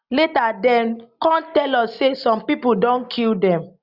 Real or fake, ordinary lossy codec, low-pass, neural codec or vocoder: real; Opus, 64 kbps; 5.4 kHz; none